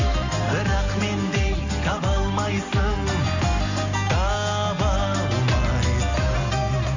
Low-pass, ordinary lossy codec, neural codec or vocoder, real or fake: 7.2 kHz; none; none; real